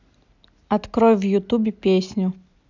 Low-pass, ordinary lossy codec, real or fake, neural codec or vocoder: 7.2 kHz; none; real; none